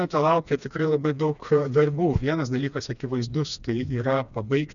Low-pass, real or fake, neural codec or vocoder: 7.2 kHz; fake; codec, 16 kHz, 2 kbps, FreqCodec, smaller model